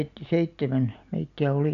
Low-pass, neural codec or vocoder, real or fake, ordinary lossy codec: 7.2 kHz; none; real; none